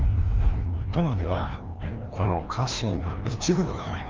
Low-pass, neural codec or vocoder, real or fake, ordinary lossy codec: 7.2 kHz; codec, 16 kHz, 1 kbps, FreqCodec, larger model; fake; Opus, 32 kbps